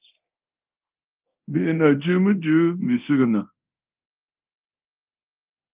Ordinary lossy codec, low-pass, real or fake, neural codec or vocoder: Opus, 32 kbps; 3.6 kHz; fake; codec, 24 kHz, 0.9 kbps, DualCodec